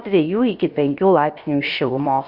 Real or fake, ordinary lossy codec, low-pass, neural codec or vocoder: fake; Opus, 64 kbps; 5.4 kHz; codec, 16 kHz, about 1 kbps, DyCAST, with the encoder's durations